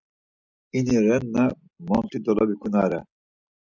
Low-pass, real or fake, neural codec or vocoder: 7.2 kHz; real; none